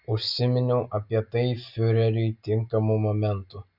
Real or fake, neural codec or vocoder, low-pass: real; none; 5.4 kHz